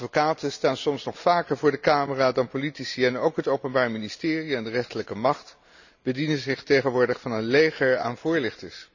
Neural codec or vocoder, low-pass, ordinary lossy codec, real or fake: none; 7.2 kHz; none; real